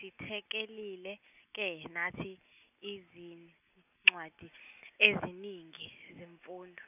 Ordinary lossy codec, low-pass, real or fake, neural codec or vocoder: none; 3.6 kHz; real; none